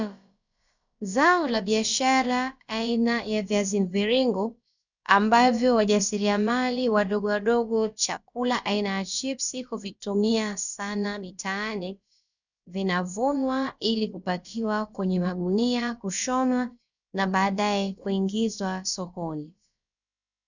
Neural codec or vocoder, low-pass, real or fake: codec, 16 kHz, about 1 kbps, DyCAST, with the encoder's durations; 7.2 kHz; fake